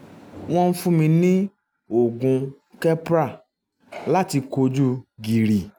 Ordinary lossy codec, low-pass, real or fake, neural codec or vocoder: none; none; real; none